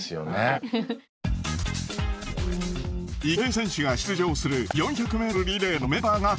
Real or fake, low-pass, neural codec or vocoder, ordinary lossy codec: real; none; none; none